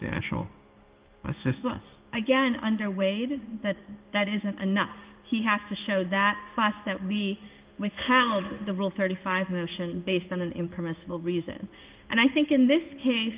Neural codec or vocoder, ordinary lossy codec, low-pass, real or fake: codec, 16 kHz in and 24 kHz out, 1 kbps, XY-Tokenizer; Opus, 32 kbps; 3.6 kHz; fake